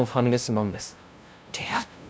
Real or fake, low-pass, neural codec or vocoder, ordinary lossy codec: fake; none; codec, 16 kHz, 0.5 kbps, FunCodec, trained on LibriTTS, 25 frames a second; none